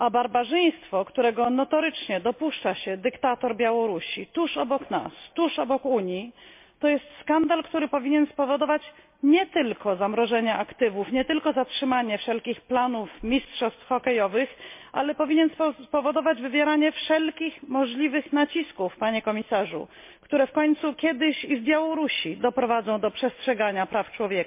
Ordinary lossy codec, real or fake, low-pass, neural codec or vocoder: MP3, 32 kbps; real; 3.6 kHz; none